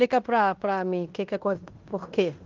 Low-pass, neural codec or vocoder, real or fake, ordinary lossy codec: 7.2 kHz; codec, 16 kHz in and 24 kHz out, 0.9 kbps, LongCat-Audio-Codec, fine tuned four codebook decoder; fake; Opus, 32 kbps